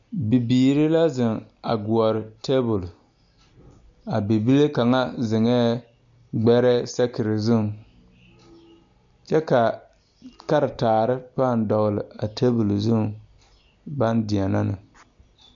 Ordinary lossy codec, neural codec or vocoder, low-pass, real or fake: MP3, 64 kbps; none; 7.2 kHz; real